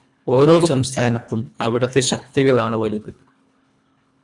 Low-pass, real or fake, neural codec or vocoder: 10.8 kHz; fake; codec, 24 kHz, 1.5 kbps, HILCodec